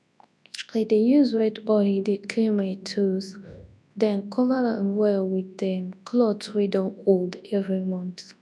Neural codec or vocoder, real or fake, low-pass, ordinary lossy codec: codec, 24 kHz, 0.9 kbps, WavTokenizer, large speech release; fake; none; none